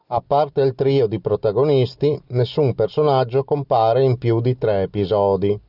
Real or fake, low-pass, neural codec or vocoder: real; 5.4 kHz; none